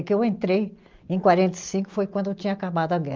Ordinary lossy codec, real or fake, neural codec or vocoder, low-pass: Opus, 32 kbps; real; none; 7.2 kHz